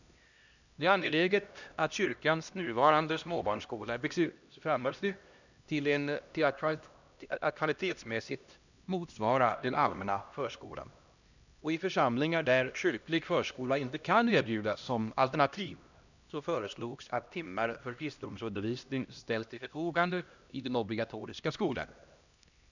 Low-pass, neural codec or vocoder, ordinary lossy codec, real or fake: 7.2 kHz; codec, 16 kHz, 1 kbps, X-Codec, HuBERT features, trained on LibriSpeech; none; fake